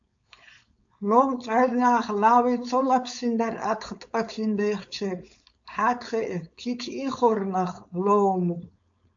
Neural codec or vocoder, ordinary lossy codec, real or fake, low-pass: codec, 16 kHz, 4.8 kbps, FACodec; AAC, 64 kbps; fake; 7.2 kHz